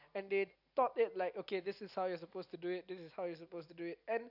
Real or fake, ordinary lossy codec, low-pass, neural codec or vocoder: real; AAC, 48 kbps; 5.4 kHz; none